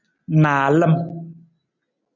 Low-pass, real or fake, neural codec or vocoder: 7.2 kHz; real; none